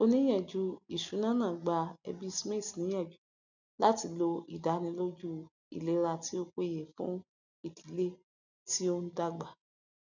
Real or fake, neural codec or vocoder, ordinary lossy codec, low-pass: real; none; none; 7.2 kHz